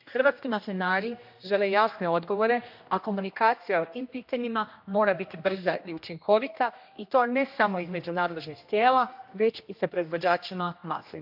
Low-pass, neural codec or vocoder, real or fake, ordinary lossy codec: 5.4 kHz; codec, 16 kHz, 1 kbps, X-Codec, HuBERT features, trained on general audio; fake; MP3, 48 kbps